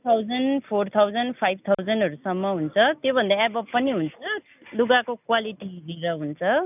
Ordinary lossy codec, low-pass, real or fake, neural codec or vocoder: none; 3.6 kHz; real; none